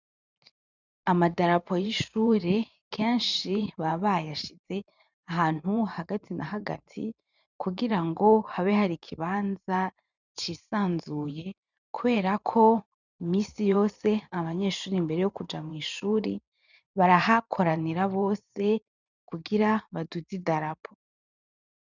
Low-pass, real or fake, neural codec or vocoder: 7.2 kHz; fake; vocoder, 44.1 kHz, 128 mel bands every 512 samples, BigVGAN v2